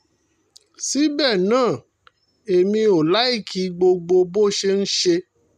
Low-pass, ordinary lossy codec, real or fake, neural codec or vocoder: 14.4 kHz; none; real; none